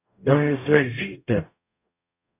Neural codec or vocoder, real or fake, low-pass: codec, 44.1 kHz, 0.9 kbps, DAC; fake; 3.6 kHz